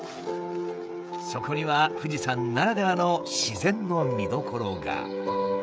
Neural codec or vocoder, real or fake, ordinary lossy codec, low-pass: codec, 16 kHz, 16 kbps, FreqCodec, smaller model; fake; none; none